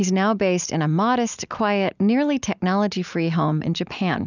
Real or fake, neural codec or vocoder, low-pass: real; none; 7.2 kHz